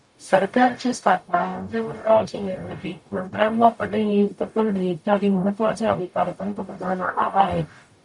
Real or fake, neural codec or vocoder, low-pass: fake; codec, 44.1 kHz, 0.9 kbps, DAC; 10.8 kHz